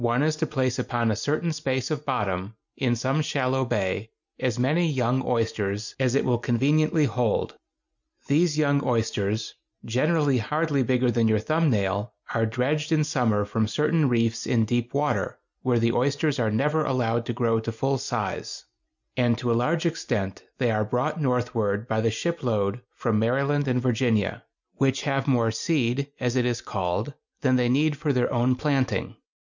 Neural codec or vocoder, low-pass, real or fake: none; 7.2 kHz; real